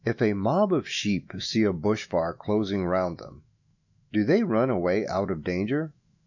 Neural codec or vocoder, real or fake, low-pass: autoencoder, 48 kHz, 128 numbers a frame, DAC-VAE, trained on Japanese speech; fake; 7.2 kHz